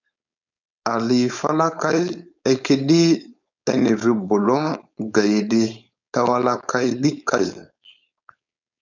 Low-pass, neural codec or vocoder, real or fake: 7.2 kHz; codec, 16 kHz, 4.8 kbps, FACodec; fake